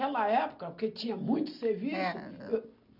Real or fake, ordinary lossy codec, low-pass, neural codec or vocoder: real; none; 5.4 kHz; none